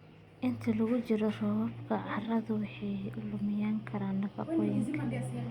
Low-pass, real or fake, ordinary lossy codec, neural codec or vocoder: 19.8 kHz; real; Opus, 64 kbps; none